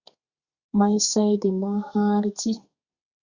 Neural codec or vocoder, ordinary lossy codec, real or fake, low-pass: codec, 32 kHz, 1.9 kbps, SNAC; Opus, 64 kbps; fake; 7.2 kHz